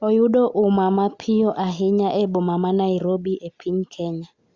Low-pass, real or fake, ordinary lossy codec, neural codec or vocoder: 7.2 kHz; real; Opus, 64 kbps; none